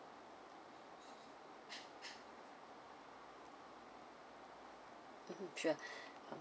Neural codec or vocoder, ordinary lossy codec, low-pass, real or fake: none; none; none; real